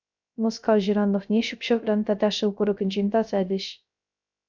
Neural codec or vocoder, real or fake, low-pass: codec, 16 kHz, 0.3 kbps, FocalCodec; fake; 7.2 kHz